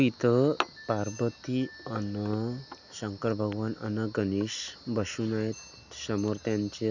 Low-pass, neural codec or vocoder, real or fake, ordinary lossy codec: 7.2 kHz; none; real; none